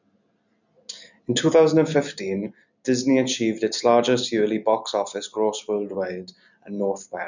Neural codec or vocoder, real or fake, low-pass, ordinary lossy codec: none; real; 7.2 kHz; none